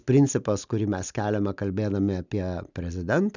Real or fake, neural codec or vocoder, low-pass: real; none; 7.2 kHz